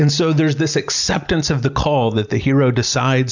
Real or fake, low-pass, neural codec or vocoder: real; 7.2 kHz; none